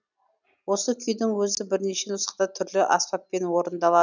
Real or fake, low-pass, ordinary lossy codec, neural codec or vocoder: real; 7.2 kHz; none; none